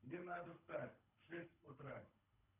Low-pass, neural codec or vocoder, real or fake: 3.6 kHz; codec, 24 kHz, 3 kbps, HILCodec; fake